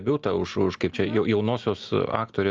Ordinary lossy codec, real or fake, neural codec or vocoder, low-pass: Opus, 24 kbps; real; none; 7.2 kHz